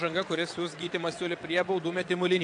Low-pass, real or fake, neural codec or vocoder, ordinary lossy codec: 9.9 kHz; fake; vocoder, 22.05 kHz, 80 mel bands, WaveNeXt; AAC, 64 kbps